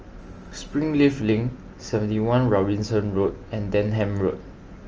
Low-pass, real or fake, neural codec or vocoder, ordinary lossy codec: 7.2 kHz; real; none; Opus, 24 kbps